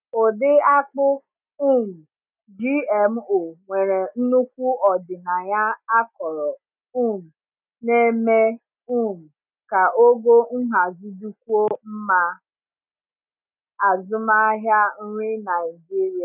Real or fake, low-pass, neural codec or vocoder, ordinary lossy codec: real; 3.6 kHz; none; none